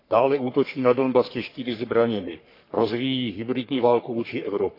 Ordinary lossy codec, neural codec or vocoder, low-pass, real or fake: AAC, 32 kbps; codec, 44.1 kHz, 3.4 kbps, Pupu-Codec; 5.4 kHz; fake